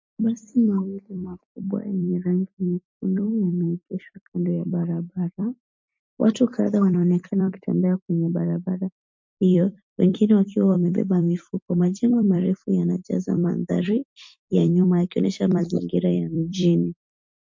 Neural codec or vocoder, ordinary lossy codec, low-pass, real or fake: vocoder, 44.1 kHz, 128 mel bands every 256 samples, BigVGAN v2; MP3, 48 kbps; 7.2 kHz; fake